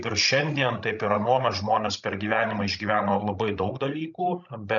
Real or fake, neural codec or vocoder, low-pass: fake; codec, 16 kHz, 16 kbps, FreqCodec, larger model; 7.2 kHz